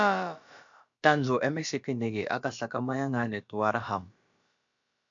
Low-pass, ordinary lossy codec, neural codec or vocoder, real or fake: 7.2 kHz; MP3, 64 kbps; codec, 16 kHz, about 1 kbps, DyCAST, with the encoder's durations; fake